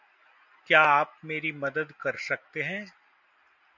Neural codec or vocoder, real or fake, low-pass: none; real; 7.2 kHz